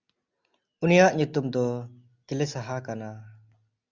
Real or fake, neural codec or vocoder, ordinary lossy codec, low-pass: real; none; Opus, 64 kbps; 7.2 kHz